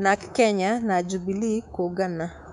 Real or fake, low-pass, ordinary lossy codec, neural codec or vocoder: fake; 10.8 kHz; none; codec, 24 kHz, 3.1 kbps, DualCodec